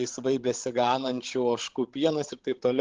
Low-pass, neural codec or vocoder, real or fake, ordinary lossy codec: 7.2 kHz; codec, 16 kHz, 16 kbps, FreqCodec, larger model; fake; Opus, 16 kbps